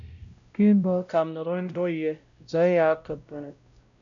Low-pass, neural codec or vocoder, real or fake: 7.2 kHz; codec, 16 kHz, 0.5 kbps, X-Codec, WavLM features, trained on Multilingual LibriSpeech; fake